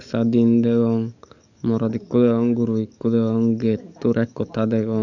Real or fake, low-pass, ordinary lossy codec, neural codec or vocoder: fake; 7.2 kHz; none; codec, 44.1 kHz, 7.8 kbps, DAC